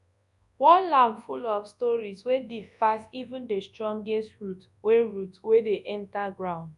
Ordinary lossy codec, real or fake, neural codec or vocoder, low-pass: none; fake; codec, 24 kHz, 0.9 kbps, WavTokenizer, large speech release; 10.8 kHz